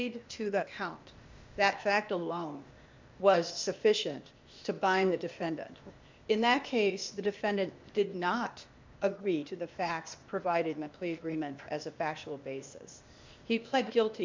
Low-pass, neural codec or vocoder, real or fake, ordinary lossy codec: 7.2 kHz; codec, 16 kHz, 0.8 kbps, ZipCodec; fake; MP3, 64 kbps